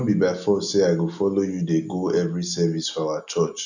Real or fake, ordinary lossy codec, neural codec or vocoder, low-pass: real; none; none; 7.2 kHz